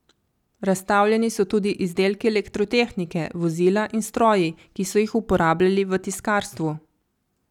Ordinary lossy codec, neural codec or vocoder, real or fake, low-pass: none; none; real; 19.8 kHz